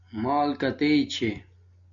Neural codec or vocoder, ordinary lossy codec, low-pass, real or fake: none; MP3, 64 kbps; 7.2 kHz; real